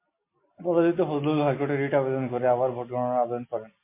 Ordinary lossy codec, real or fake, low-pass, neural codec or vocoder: MP3, 24 kbps; real; 3.6 kHz; none